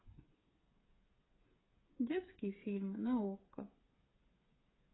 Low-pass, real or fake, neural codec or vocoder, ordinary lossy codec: 7.2 kHz; fake; codec, 16 kHz, 8 kbps, FreqCodec, smaller model; AAC, 16 kbps